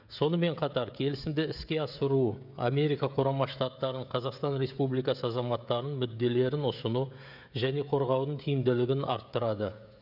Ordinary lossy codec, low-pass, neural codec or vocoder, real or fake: none; 5.4 kHz; codec, 16 kHz, 16 kbps, FreqCodec, smaller model; fake